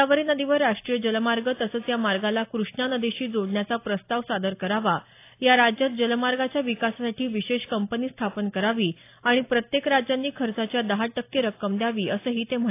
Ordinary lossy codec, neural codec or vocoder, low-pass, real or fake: AAC, 24 kbps; none; 3.6 kHz; real